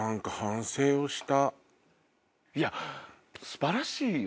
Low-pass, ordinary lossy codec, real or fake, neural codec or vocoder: none; none; real; none